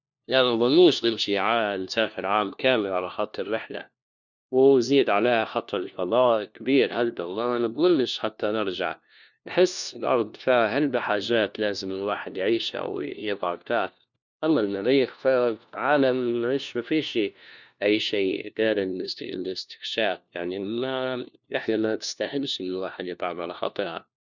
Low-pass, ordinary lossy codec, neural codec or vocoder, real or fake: 7.2 kHz; none; codec, 16 kHz, 1 kbps, FunCodec, trained on LibriTTS, 50 frames a second; fake